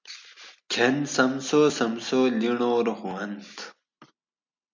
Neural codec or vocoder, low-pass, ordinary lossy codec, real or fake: none; 7.2 kHz; MP3, 64 kbps; real